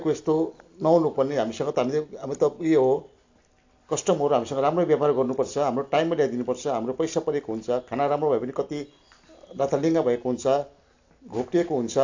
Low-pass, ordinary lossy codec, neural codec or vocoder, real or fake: 7.2 kHz; AAC, 48 kbps; none; real